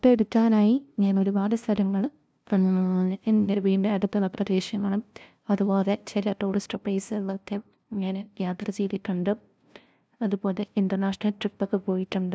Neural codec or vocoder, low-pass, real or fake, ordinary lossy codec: codec, 16 kHz, 0.5 kbps, FunCodec, trained on LibriTTS, 25 frames a second; none; fake; none